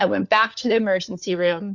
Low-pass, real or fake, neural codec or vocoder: 7.2 kHz; fake; codec, 16 kHz, 4 kbps, FunCodec, trained on LibriTTS, 50 frames a second